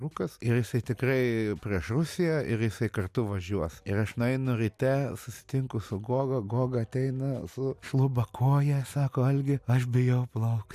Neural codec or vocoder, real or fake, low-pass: none; real; 14.4 kHz